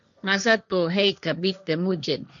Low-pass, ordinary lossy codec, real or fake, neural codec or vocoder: 7.2 kHz; MP3, 96 kbps; fake; codec, 16 kHz, 1.1 kbps, Voila-Tokenizer